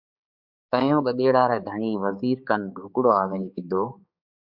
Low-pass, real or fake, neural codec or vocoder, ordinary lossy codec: 5.4 kHz; fake; codec, 16 kHz, 4 kbps, X-Codec, HuBERT features, trained on balanced general audio; Opus, 64 kbps